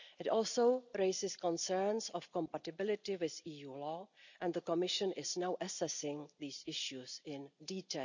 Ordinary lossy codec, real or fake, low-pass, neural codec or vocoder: none; real; 7.2 kHz; none